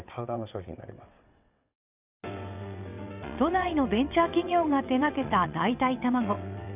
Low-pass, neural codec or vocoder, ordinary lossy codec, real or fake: 3.6 kHz; vocoder, 22.05 kHz, 80 mel bands, WaveNeXt; none; fake